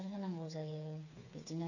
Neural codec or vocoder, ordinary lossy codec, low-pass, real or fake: codec, 16 kHz, 4 kbps, FreqCodec, smaller model; none; 7.2 kHz; fake